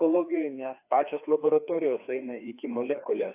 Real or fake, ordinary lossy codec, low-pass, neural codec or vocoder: fake; AAC, 24 kbps; 3.6 kHz; codec, 16 kHz, 2 kbps, FreqCodec, larger model